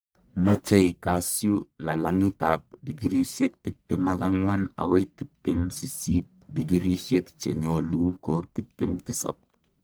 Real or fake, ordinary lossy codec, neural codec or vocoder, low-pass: fake; none; codec, 44.1 kHz, 1.7 kbps, Pupu-Codec; none